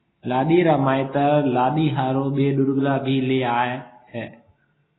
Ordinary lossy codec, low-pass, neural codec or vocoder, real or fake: AAC, 16 kbps; 7.2 kHz; none; real